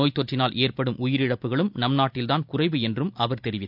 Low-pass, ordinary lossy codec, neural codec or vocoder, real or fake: 5.4 kHz; none; none; real